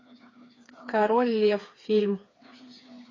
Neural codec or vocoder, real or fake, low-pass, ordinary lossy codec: codec, 16 kHz, 4 kbps, FreqCodec, smaller model; fake; 7.2 kHz; AAC, 32 kbps